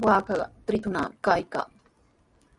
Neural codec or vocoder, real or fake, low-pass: none; real; 10.8 kHz